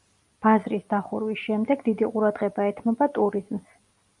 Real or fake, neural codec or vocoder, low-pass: real; none; 10.8 kHz